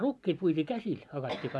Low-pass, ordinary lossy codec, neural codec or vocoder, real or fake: none; none; none; real